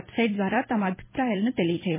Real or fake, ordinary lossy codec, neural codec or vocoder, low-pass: fake; MP3, 16 kbps; vocoder, 44.1 kHz, 128 mel bands every 256 samples, BigVGAN v2; 3.6 kHz